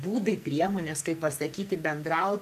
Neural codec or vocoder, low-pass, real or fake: codec, 44.1 kHz, 2.6 kbps, SNAC; 14.4 kHz; fake